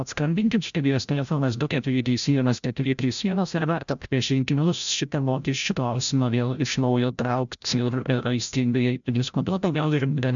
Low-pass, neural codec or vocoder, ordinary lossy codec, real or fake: 7.2 kHz; codec, 16 kHz, 0.5 kbps, FreqCodec, larger model; MP3, 96 kbps; fake